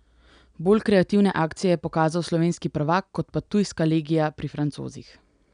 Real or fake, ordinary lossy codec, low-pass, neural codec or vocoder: real; none; 9.9 kHz; none